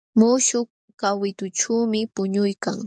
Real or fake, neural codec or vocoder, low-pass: fake; codec, 44.1 kHz, 7.8 kbps, DAC; 9.9 kHz